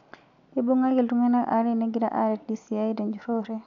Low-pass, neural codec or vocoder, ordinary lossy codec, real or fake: 7.2 kHz; none; none; real